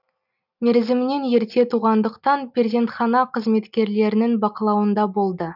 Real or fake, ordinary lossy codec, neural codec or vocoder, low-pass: real; none; none; 5.4 kHz